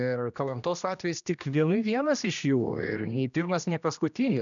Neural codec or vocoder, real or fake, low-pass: codec, 16 kHz, 1 kbps, X-Codec, HuBERT features, trained on general audio; fake; 7.2 kHz